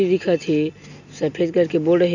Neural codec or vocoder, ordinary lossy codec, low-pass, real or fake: none; none; 7.2 kHz; real